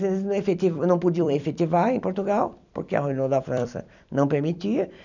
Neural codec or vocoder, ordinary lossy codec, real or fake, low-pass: vocoder, 44.1 kHz, 128 mel bands every 256 samples, BigVGAN v2; none; fake; 7.2 kHz